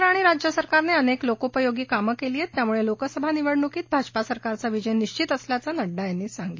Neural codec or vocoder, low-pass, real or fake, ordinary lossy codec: none; 7.2 kHz; real; MP3, 32 kbps